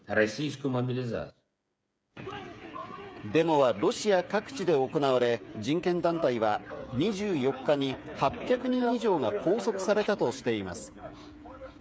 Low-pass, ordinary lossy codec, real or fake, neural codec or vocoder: none; none; fake; codec, 16 kHz, 8 kbps, FreqCodec, smaller model